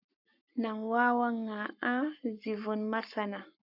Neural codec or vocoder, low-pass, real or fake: codec, 44.1 kHz, 7.8 kbps, Pupu-Codec; 5.4 kHz; fake